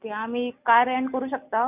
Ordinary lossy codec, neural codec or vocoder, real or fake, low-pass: none; none; real; 3.6 kHz